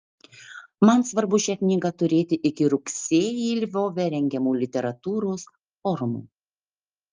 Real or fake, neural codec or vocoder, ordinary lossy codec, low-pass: real; none; Opus, 24 kbps; 7.2 kHz